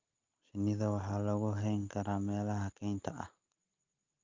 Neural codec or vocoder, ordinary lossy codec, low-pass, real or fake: none; Opus, 24 kbps; 7.2 kHz; real